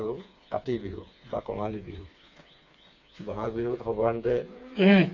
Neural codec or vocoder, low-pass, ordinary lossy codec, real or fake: codec, 16 kHz, 4 kbps, FreqCodec, smaller model; 7.2 kHz; none; fake